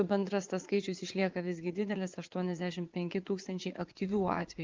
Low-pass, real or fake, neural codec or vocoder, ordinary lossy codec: 7.2 kHz; fake; vocoder, 44.1 kHz, 80 mel bands, Vocos; Opus, 24 kbps